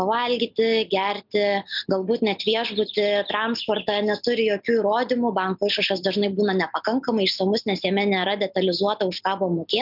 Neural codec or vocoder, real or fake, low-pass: none; real; 5.4 kHz